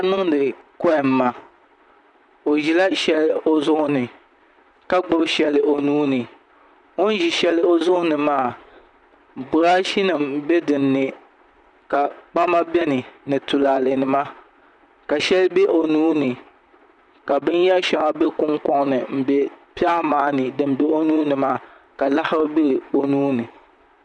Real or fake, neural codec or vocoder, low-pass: fake; vocoder, 44.1 kHz, 128 mel bands, Pupu-Vocoder; 10.8 kHz